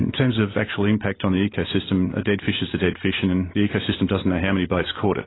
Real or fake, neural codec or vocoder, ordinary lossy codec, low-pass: real; none; AAC, 16 kbps; 7.2 kHz